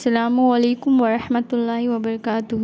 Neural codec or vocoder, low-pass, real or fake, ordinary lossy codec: none; none; real; none